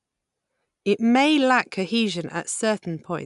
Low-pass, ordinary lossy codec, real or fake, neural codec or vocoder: 10.8 kHz; none; real; none